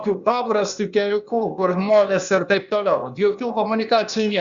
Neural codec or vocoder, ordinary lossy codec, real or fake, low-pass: codec, 16 kHz, 0.8 kbps, ZipCodec; Opus, 64 kbps; fake; 7.2 kHz